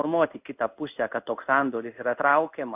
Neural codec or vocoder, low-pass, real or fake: codec, 16 kHz in and 24 kHz out, 1 kbps, XY-Tokenizer; 3.6 kHz; fake